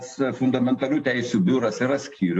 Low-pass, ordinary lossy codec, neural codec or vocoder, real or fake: 10.8 kHz; AAC, 48 kbps; none; real